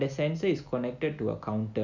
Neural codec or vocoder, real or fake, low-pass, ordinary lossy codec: none; real; 7.2 kHz; none